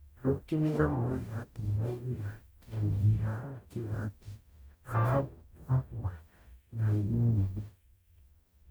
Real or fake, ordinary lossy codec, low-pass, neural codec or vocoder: fake; none; none; codec, 44.1 kHz, 0.9 kbps, DAC